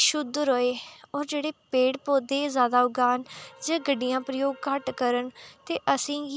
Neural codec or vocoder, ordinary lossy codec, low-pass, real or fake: none; none; none; real